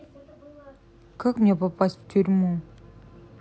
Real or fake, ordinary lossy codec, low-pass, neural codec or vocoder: real; none; none; none